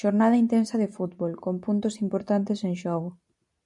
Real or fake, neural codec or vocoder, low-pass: real; none; 10.8 kHz